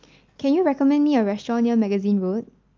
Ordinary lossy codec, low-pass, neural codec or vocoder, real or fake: Opus, 24 kbps; 7.2 kHz; none; real